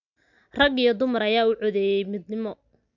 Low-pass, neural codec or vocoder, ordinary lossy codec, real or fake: 7.2 kHz; none; none; real